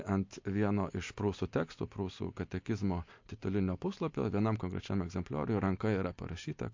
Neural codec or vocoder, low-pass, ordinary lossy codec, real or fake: none; 7.2 kHz; MP3, 48 kbps; real